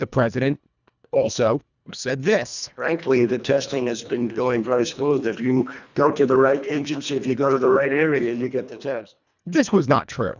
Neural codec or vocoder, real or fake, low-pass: codec, 24 kHz, 1.5 kbps, HILCodec; fake; 7.2 kHz